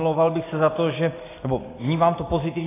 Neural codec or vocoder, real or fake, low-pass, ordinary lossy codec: none; real; 3.6 kHz; AAC, 16 kbps